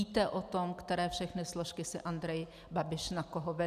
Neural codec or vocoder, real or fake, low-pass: none; real; 14.4 kHz